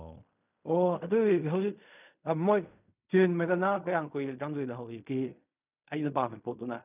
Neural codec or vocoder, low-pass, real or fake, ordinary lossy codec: codec, 16 kHz in and 24 kHz out, 0.4 kbps, LongCat-Audio-Codec, fine tuned four codebook decoder; 3.6 kHz; fake; none